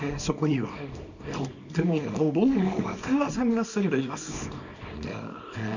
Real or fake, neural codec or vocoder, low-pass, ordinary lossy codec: fake; codec, 24 kHz, 0.9 kbps, WavTokenizer, small release; 7.2 kHz; none